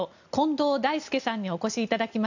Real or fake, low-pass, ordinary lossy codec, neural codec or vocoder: real; 7.2 kHz; none; none